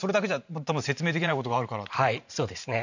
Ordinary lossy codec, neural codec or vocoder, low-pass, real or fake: none; vocoder, 44.1 kHz, 128 mel bands every 512 samples, BigVGAN v2; 7.2 kHz; fake